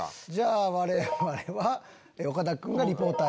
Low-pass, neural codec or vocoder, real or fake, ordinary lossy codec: none; none; real; none